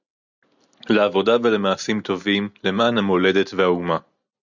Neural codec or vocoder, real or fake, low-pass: none; real; 7.2 kHz